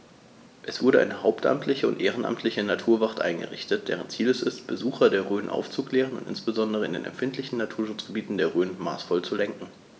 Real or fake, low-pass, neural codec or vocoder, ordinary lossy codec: real; none; none; none